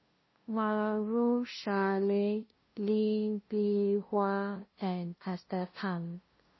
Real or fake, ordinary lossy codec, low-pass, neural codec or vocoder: fake; MP3, 24 kbps; 7.2 kHz; codec, 16 kHz, 0.5 kbps, FunCodec, trained on LibriTTS, 25 frames a second